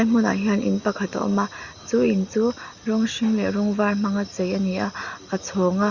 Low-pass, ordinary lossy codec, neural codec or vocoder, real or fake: 7.2 kHz; Opus, 64 kbps; none; real